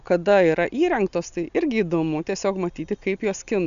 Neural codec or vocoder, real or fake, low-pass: none; real; 7.2 kHz